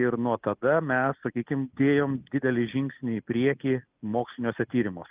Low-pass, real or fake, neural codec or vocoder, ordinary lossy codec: 3.6 kHz; real; none; Opus, 32 kbps